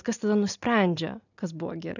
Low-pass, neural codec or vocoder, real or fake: 7.2 kHz; none; real